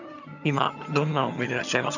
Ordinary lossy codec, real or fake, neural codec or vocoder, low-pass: none; fake; vocoder, 22.05 kHz, 80 mel bands, HiFi-GAN; 7.2 kHz